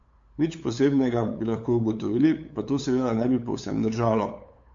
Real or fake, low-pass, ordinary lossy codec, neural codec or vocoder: fake; 7.2 kHz; MP3, 48 kbps; codec, 16 kHz, 8 kbps, FunCodec, trained on LibriTTS, 25 frames a second